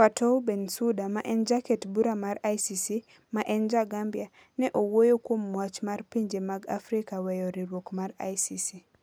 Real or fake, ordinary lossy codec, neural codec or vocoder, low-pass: real; none; none; none